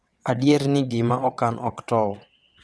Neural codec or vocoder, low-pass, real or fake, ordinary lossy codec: vocoder, 22.05 kHz, 80 mel bands, WaveNeXt; none; fake; none